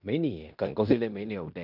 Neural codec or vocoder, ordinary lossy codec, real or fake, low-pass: codec, 16 kHz in and 24 kHz out, 0.9 kbps, LongCat-Audio-Codec, fine tuned four codebook decoder; none; fake; 5.4 kHz